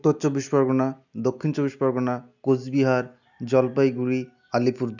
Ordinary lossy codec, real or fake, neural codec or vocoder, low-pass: none; real; none; 7.2 kHz